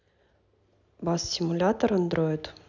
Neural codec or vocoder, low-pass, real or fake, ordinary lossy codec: none; 7.2 kHz; real; none